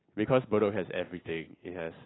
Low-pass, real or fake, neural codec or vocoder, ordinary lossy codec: 7.2 kHz; real; none; AAC, 16 kbps